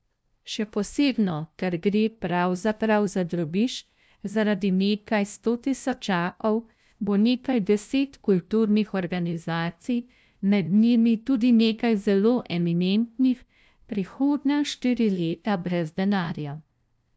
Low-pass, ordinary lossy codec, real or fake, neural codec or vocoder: none; none; fake; codec, 16 kHz, 0.5 kbps, FunCodec, trained on LibriTTS, 25 frames a second